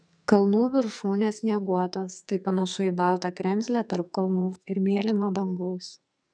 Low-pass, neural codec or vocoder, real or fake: 9.9 kHz; codec, 44.1 kHz, 2.6 kbps, SNAC; fake